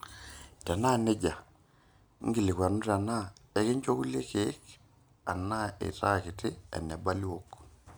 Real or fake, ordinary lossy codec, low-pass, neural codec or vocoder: real; none; none; none